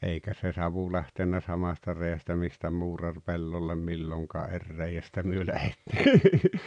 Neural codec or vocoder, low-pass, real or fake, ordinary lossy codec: none; 9.9 kHz; real; none